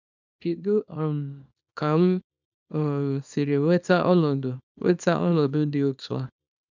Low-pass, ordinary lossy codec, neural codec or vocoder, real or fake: 7.2 kHz; none; codec, 24 kHz, 0.9 kbps, WavTokenizer, small release; fake